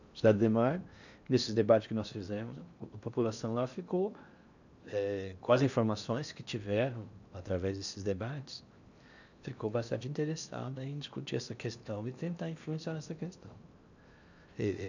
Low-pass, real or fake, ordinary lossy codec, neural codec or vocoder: 7.2 kHz; fake; none; codec, 16 kHz in and 24 kHz out, 0.8 kbps, FocalCodec, streaming, 65536 codes